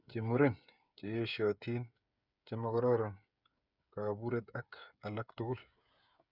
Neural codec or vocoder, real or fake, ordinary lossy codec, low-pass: codec, 16 kHz, 8 kbps, FreqCodec, smaller model; fake; none; 5.4 kHz